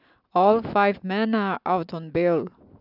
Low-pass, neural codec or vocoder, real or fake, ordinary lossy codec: 5.4 kHz; none; real; none